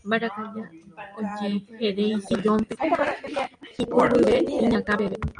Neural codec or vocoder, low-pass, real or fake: none; 9.9 kHz; real